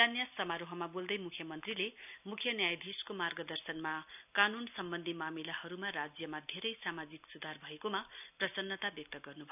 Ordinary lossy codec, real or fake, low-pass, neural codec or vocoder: none; real; 3.6 kHz; none